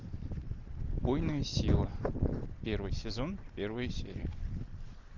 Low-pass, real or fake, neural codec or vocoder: 7.2 kHz; real; none